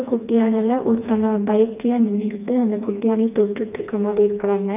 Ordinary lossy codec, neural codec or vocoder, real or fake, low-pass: none; codec, 16 kHz, 2 kbps, FreqCodec, smaller model; fake; 3.6 kHz